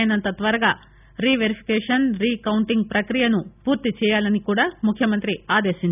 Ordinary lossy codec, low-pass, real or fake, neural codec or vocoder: none; 3.6 kHz; real; none